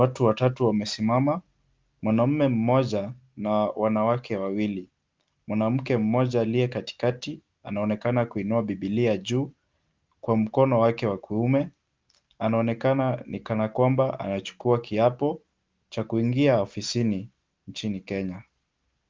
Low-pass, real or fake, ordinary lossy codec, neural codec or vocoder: 7.2 kHz; real; Opus, 32 kbps; none